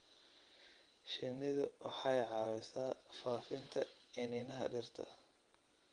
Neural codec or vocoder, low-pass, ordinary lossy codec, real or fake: vocoder, 22.05 kHz, 80 mel bands, WaveNeXt; 9.9 kHz; Opus, 24 kbps; fake